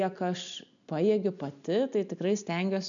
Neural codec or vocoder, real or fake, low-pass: none; real; 7.2 kHz